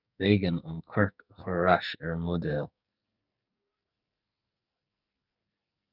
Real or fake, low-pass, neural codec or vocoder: fake; 5.4 kHz; codec, 44.1 kHz, 2.6 kbps, SNAC